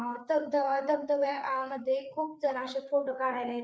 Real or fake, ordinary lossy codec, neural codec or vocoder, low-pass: fake; none; codec, 16 kHz, 4 kbps, FreqCodec, larger model; none